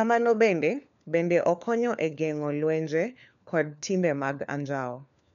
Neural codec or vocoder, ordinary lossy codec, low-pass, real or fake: codec, 16 kHz, 4 kbps, FunCodec, trained on LibriTTS, 50 frames a second; none; 7.2 kHz; fake